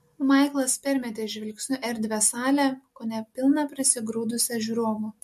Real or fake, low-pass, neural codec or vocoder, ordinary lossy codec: real; 14.4 kHz; none; MP3, 64 kbps